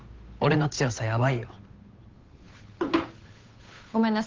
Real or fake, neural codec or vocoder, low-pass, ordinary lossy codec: fake; codec, 16 kHz, 8 kbps, FunCodec, trained on Chinese and English, 25 frames a second; 7.2 kHz; Opus, 32 kbps